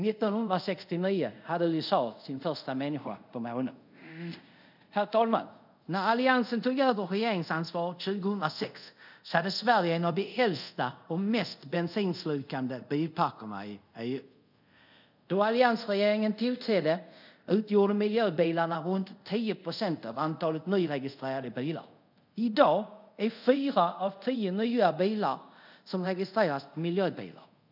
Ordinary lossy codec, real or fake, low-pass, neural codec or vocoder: none; fake; 5.4 kHz; codec, 24 kHz, 0.5 kbps, DualCodec